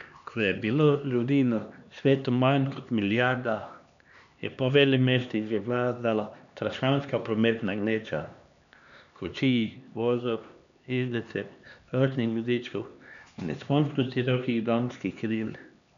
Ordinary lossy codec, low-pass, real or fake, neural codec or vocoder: none; 7.2 kHz; fake; codec, 16 kHz, 2 kbps, X-Codec, HuBERT features, trained on LibriSpeech